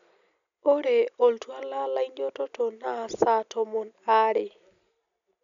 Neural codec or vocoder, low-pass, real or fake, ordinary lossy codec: none; 7.2 kHz; real; MP3, 96 kbps